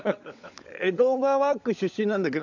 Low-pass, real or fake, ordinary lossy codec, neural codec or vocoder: 7.2 kHz; fake; none; codec, 16 kHz, 16 kbps, FunCodec, trained on LibriTTS, 50 frames a second